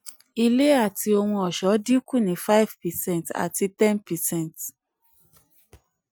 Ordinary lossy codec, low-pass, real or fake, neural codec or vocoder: none; none; real; none